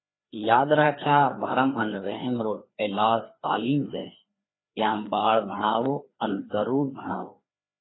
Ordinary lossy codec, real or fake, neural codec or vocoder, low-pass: AAC, 16 kbps; fake; codec, 16 kHz, 2 kbps, FreqCodec, larger model; 7.2 kHz